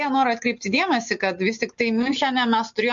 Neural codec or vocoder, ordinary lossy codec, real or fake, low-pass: none; MP3, 48 kbps; real; 7.2 kHz